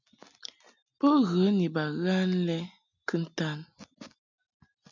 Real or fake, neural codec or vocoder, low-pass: real; none; 7.2 kHz